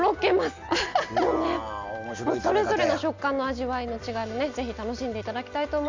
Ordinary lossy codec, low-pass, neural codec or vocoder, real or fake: MP3, 64 kbps; 7.2 kHz; none; real